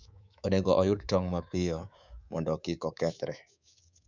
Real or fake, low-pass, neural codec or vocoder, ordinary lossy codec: fake; 7.2 kHz; codec, 24 kHz, 3.1 kbps, DualCodec; none